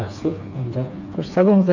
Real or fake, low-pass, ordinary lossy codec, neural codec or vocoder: fake; 7.2 kHz; none; codec, 16 kHz, 4 kbps, FreqCodec, smaller model